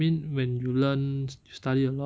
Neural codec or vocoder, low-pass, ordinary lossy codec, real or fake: none; none; none; real